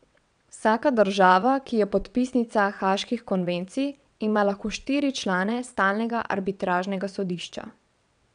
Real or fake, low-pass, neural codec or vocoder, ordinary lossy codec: fake; 9.9 kHz; vocoder, 22.05 kHz, 80 mel bands, WaveNeXt; none